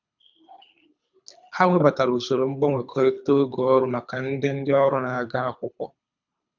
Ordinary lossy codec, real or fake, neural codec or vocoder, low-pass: none; fake; codec, 24 kHz, 3 kbps, HILCodec; 7.2 kHz